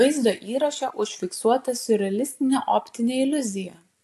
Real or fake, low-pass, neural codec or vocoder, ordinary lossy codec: real; 14.4 kHz; none; AAC, 64 kbps